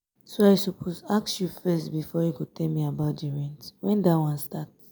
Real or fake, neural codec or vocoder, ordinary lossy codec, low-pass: real; none; none; none